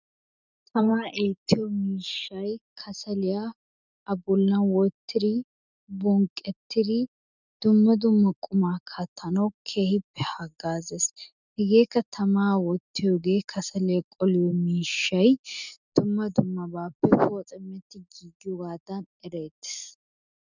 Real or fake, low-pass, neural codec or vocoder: real; 7.2 kHz; none